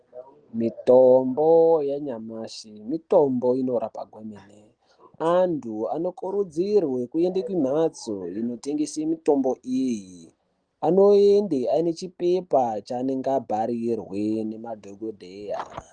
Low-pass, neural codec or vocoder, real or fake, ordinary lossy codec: 9.9 kHz; none; real; Opus, 24 kbps